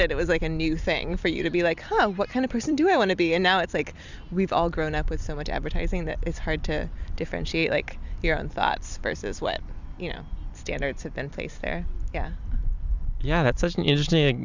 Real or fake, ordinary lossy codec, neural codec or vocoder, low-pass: fake; Opus, 64 kbps; vocoder, 44.1 kHz, 128 mel bands every 256 samples, BigVGAN v2; 7.2 kHz